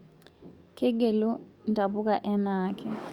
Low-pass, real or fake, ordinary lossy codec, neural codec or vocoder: 19.8 kHz; fake; Opus, 64 kbps; codec, 44.1 kHz, 7.8 kbps, DAC